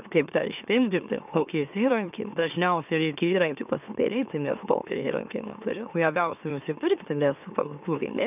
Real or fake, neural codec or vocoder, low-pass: fake; autoencoder, 44.1 kHz, a latent of 192 numbers a frame, MeloTTS; 3.6 kHz